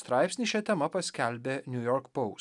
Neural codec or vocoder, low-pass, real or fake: none; 10.8 kHz; real